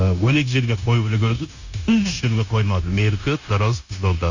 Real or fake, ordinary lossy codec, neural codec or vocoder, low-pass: fake; Opus, 64 kbps; codec, 16 kHz, 0.9 kbps, LongCat-Audio-Codec; 7.2 kHz